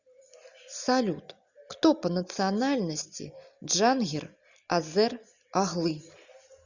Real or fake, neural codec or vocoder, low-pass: real; none; 7.2 kHz